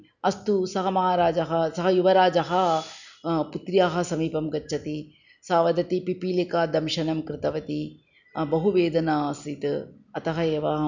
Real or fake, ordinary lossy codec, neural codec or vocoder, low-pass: real; none; none; 7.2 kHz